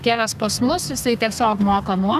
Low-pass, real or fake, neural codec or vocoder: 14.4 kHz; fake; codec, 32 kHz, 1.9 kbps, SNAC